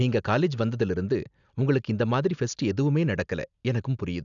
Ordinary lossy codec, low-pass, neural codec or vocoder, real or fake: none; 7.2 kHz; none; real